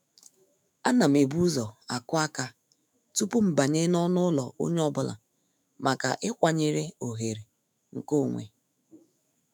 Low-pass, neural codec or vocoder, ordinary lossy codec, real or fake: none; autoencoder, 48 kHz, 128 numbers a frame, DAC-VAE, trained on Japanese speech; none; fake